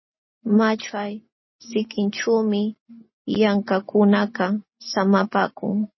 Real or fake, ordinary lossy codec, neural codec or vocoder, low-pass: real; MP3, 24 kbps; none; 7.2 kHz